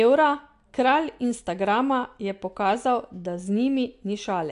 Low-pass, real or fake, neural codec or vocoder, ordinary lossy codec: 10.8 kHz; real; none; AAC, 64 kbps